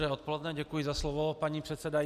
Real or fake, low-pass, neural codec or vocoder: real; 14.4 kHz; none